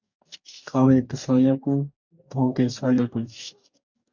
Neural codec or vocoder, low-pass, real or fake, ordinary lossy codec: codec, 44.1 kHz, 2.6 kbps, DAC; 7.2 kHz; fake; MP3, 64 kbps